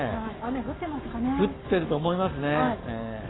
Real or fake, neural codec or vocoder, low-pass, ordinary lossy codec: fake; codec, 44.1 kHz, 7.8 kbps, Pupu-Codec; 7.2 kHz; AAC, 16 kbps